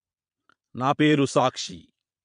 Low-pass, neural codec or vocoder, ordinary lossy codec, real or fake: 9.9 kHz; vocoder, 22.05 kHz, 80 mel bands, Vocos; MP3, 64 kbps; fake